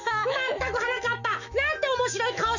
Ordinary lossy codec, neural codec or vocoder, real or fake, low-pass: none; autoencoder, 48 kHz, 128 numbers a frame, DAC-VAE, trained on Japanese speech; fake; 7.2 kHz